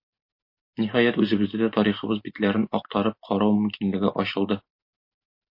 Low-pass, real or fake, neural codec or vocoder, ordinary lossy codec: 5.4 kHz; real; none; MP3, 32 kbps